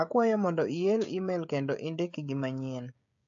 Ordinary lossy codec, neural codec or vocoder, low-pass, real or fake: AAC, 64 kbps; codec, 16 kHz, 16 kbps, FreqCodec, smaller model; 7.2 kHz; fake